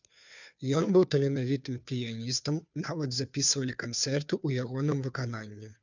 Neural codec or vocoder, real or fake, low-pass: codec, 16 kHz, 2 kbps, FunCodec, trained on Chinese and English, 25 frames a second; fake; 7.2 kHz